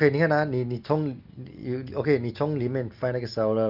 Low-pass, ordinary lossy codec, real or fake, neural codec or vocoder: 5.4 kHz; Opus, 24 kbps; real; none